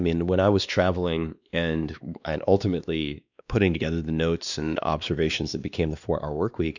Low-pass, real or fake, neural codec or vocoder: 7.2 kHz; fake; codec, 16 kHz, 2 kbps, X-Codec, WavLM features, trained on Multilingual LibriSpeech